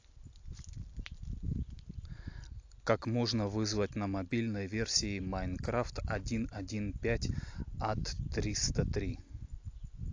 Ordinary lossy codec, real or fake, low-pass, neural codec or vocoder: AAC, 48 kbps; real; 7.2 kHz; none